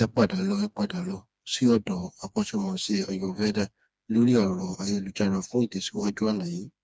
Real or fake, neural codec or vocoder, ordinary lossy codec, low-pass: fake; codec, 16 kHz, 2 kbps, FreqCodec, smaller model; none; none